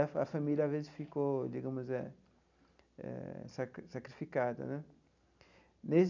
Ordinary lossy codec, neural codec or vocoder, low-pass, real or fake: none; none; 7.2 kHz; real